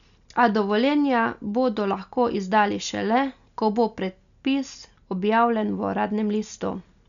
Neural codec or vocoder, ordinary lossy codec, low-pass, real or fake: none; none; 7.2 kHz; real